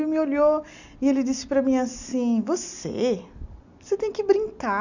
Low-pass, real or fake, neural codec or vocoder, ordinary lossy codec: 7.2 kHz; real; none; none